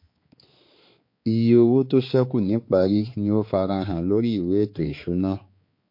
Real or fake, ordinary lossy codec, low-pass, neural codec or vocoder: fake; MP3, 32 kbps; 5.4 kHz; codec, 16 kHz, 4 kbps, X-Codec, HuBERT features, trained on balanced general audio